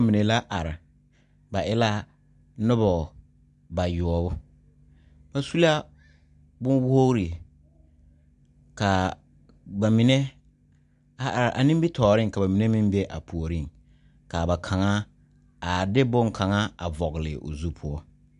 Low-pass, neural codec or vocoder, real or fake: 10.8 kHz; none; real